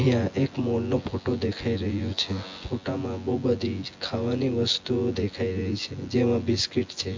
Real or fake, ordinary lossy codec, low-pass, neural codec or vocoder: fake; MP3, 48 kbps; 7.2 kHz; vocoder, 24 kHz, 100 mel bands, Vocos